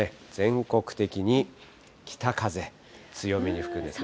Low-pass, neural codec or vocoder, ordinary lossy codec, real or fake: none; none; none; real